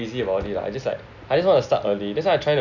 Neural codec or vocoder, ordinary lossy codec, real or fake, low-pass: none; none; real; 7.2 kHz